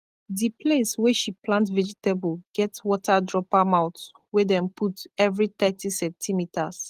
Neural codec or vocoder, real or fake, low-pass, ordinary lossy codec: none; real; 14.4 kHz; Opus, 24 kbps